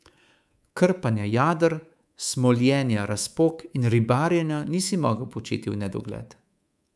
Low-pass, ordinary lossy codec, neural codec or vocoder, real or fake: none; none; codec, 24 kHz, 3.1 kbps, DualCodec; fake